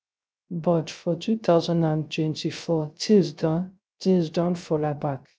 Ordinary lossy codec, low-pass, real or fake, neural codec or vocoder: none; none; fake; codec, 16 kHz, 0.3 kbps, FocalCodec